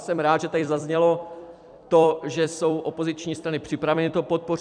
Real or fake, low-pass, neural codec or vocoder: fake; 9.9 kHz; vocoder, 44.1 kHz, 128 mel bands every 256 samples, BigVGAN v2